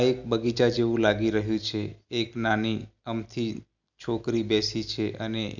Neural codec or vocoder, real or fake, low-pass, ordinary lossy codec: none; real; 7.2 kHz; none